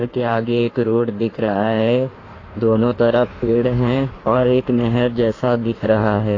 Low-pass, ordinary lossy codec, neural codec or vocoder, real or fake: 7.2 kHz; AAC, 32 kbps; codec, 16 kHz in and 24 kHz out, 1.1 kbps, FireRedTTS-2 codec; fake